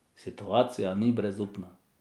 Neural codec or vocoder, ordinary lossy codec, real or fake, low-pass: none; Opus, 32 kbps; real; 19.8 kHz